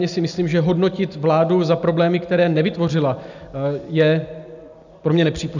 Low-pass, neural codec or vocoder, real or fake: 7.2 kHz; none; real